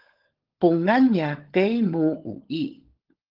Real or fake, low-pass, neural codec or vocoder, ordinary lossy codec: fake; 5.4 kHz; codec, 16 kHz, 16 kbps, FunCodec, trained on LibriTTS, 50 frames a second; Opus, 16 kbps